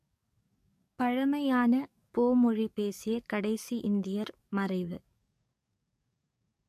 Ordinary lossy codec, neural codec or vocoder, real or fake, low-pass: MP3, 64 kbps; codec, 44.1 kHz, 7.8 kbps, DAC; fake; 14.4 kHz